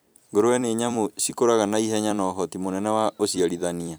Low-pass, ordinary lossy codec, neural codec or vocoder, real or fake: none; none; vocoder, 44.1 kHz, 128 mel bands every 256 samples, BigVGAN v2; fake